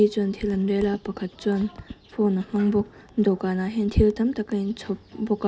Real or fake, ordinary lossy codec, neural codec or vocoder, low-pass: real; none; none; none